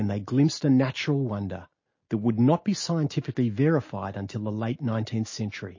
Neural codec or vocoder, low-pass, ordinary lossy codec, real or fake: none; 7.2 kHz; MP3, 32 kbps; real